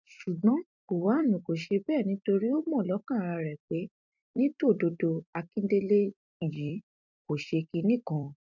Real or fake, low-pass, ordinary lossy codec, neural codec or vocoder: fake; 7.2 kHz; none; vocoder, 44.1 kHz, 128 mel bands every 256 samples, BigVGAN v2